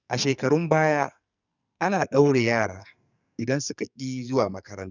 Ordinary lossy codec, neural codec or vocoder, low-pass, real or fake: none; codec, 44.1 kHz, 2.6 kbps, SNAC; 7.2 kHz; fake